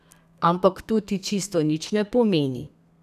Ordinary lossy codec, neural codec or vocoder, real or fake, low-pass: none; codec, 32 kHz, 1.9 kbps, SNAC; fake; 14.4 kHz